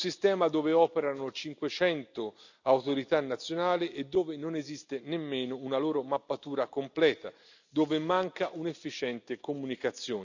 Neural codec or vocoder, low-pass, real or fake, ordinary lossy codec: none; 7.2 kHz; real; none